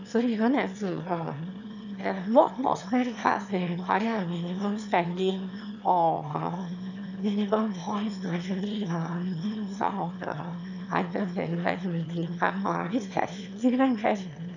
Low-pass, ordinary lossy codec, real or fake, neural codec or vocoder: 7.2 kHz; none; fake; autoencoder, 22.05 kHz, a latent of 192 numbers a frame, VITS, trained on one speaker